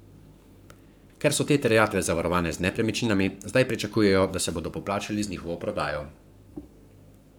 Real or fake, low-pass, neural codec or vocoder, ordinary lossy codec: fake; none; codec, 44.1 kHz, 7.8 kbps, Pupu-Codec; none